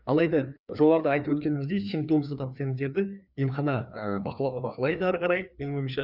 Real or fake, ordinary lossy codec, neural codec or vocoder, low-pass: fake; none; codec, 16 kHz, 2 kbps, FreqCodec, larger model; 5.4 kHz